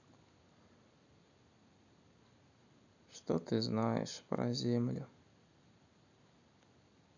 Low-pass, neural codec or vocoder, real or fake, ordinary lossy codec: 7.2 kHz; none; real; none